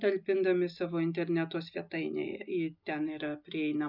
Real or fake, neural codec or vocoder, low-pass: real; none; 5.4 kHz